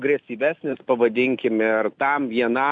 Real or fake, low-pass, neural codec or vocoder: real; 9.9 kHz; none